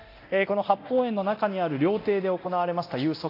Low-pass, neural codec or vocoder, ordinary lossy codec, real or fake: 5.4 kHz; codec, 24 kHz, 0.9 kbps, DualCodec; AAC, 32 kbps; fake